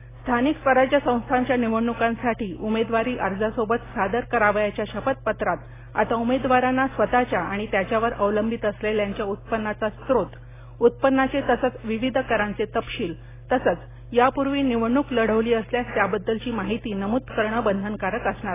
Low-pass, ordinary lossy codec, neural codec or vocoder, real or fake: 3.6 kHz; AAC, 16 kbps; none; real